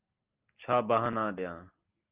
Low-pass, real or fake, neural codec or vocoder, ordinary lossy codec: 3.6 kHz; real; none; Opus, 32 kbps